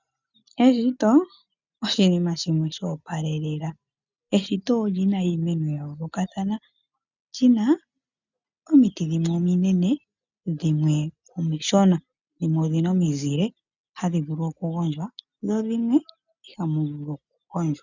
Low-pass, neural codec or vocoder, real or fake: 7.2 kHz; none; real